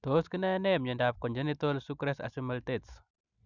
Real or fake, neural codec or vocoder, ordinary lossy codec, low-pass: real; none; none; 7.2 kHz